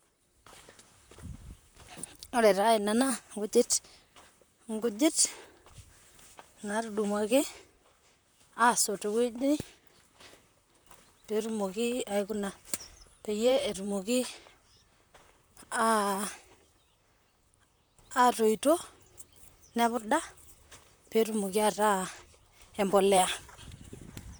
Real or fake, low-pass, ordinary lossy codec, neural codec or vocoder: fake; none; none; vocoder, 44.1 kHz, 128 mel bands, Pupu-Vocoder